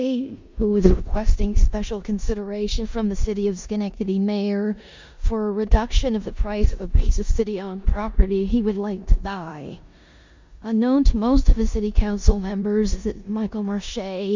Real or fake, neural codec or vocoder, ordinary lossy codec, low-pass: fake; codec, 16 kHz in and 24 kHz out, 0.9 kbps, LongCat-Audio-Codec, four codebook decoder; MP3, 64 kbps; 7.2 kHz